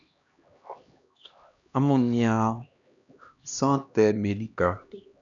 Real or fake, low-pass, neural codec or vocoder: fake; 7.2 kHz; codec, 16 kHz, 1 kbps, X-Codec, HuBERT features, trained on LibriSpeech